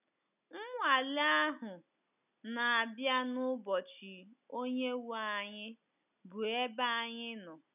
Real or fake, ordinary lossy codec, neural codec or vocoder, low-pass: real; none; none; 3.6 kHz